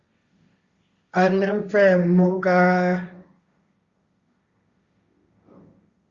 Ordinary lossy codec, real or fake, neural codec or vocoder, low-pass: Opus, 64 kbps; fake; codec, 16 kHz, 1.1 kbps, Voila-Tokenizer; 7.2 kHz